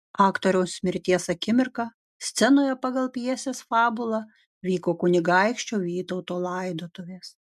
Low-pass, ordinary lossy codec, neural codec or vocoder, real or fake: 14.4 kHz; MP3, 96 kbps; none; real